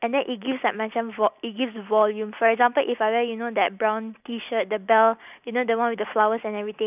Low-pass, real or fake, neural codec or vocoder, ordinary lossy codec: 3.6 kHz; real; none; none